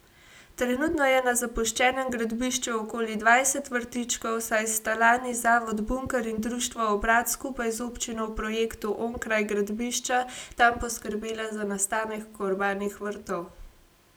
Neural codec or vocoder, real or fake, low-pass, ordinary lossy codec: none; real; none; none